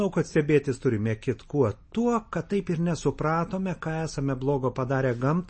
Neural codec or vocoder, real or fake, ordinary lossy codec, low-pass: none; real; MP3, 32 kbps; 9.9 kHz